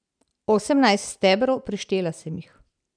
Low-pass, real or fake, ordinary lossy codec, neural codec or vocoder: 9.9 kHz; real; none; none